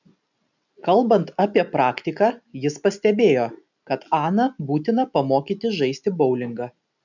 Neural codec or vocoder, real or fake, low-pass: none; real; 7.2 kHz